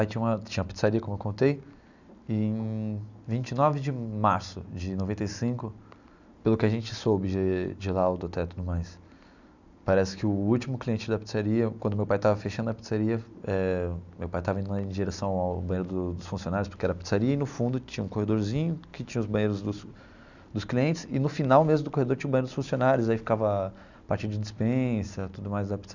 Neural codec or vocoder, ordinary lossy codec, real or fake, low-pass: none; none; real; 7.2 kHz